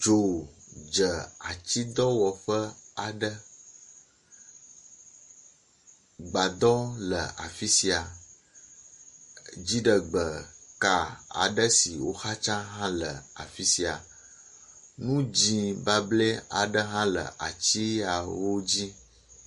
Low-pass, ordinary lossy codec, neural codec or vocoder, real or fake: 14.4 kHz; MP3, 48 kbps; none; real